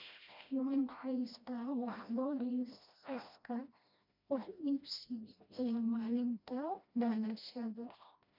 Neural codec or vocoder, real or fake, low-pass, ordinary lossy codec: codec, 16 kHz, 1 kbps, FreqCodec, smaller model; fake; 5.4 kHz; MP3, 48 kbps